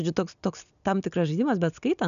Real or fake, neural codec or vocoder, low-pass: real; none; 7.2 kHz